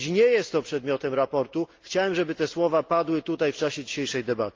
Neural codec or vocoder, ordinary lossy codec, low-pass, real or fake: none; Opus, 32 kbps; 7.2 kHz; real